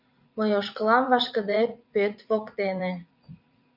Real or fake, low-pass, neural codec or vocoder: fake; 5.4 kHz; vocoder, 44.1 kHz, 80 mel bands, Vocos